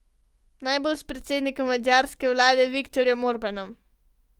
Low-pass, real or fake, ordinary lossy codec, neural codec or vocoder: 19.8 kHz; fake; Opus, 24 kbps; autoencoder, 48 kHz, 128 numbers a frame, DAC-VAE, trained on Japanese speech